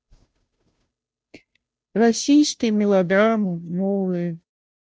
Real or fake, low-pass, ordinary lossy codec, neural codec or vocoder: fake; none; none; codec, 16 kHz, 0.5 kbps, FunCodec, trained on Chinese and English, 25 frames a second